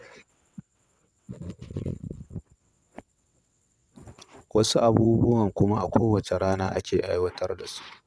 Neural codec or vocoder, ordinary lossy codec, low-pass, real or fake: vocoder, 22.05 kHz, 80 mel bands, WaveNeXt; none; none; fake